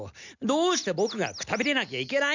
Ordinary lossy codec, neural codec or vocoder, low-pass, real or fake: none; none; 7.2 kHz; real